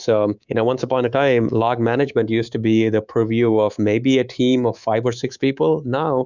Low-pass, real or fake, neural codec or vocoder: 7.2 kHz; fake; codec, 24 kHz, 3.1 kbps, DualCodec